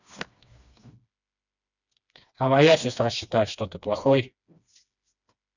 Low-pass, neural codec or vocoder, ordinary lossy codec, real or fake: 7.2 kHz; codec, 16 kHz, 2 kbps, FreqCodec, smaller model; none; fake